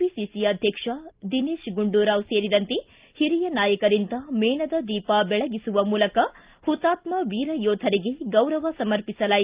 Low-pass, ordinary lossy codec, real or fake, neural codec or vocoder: 3.6 kHz; Opus, 32 kbps; real; none